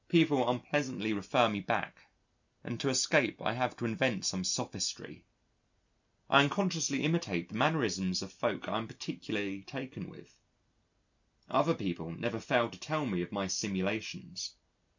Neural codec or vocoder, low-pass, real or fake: none; 7.2 kHz; real